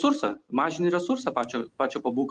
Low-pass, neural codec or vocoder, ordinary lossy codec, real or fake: 9.9 kHz; none; Opus, 24 kbps; real